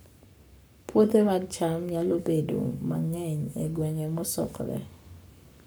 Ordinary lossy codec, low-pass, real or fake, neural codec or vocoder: none; none; fake; codec, 44.1 kHz, 7.8 kbps, Pupu-Codec